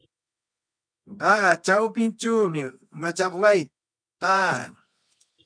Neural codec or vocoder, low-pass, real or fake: codec, 24 kHz, 0.9 kbps, WavTokenizer, medium music audio release; 9.9 kHz; fake